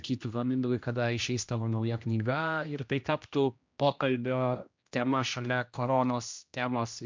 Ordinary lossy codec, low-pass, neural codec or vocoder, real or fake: MP3, 64 kbps; 7.2 kHz; codec, 16 kHz, 1 kbps, X-Codec, HuBERT features, trained on general audio; fake